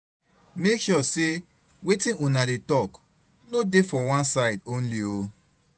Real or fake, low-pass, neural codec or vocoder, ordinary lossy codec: fake; 9.9 kHz; vocoder, 48 kHz, 128 mel bands, Vocos; none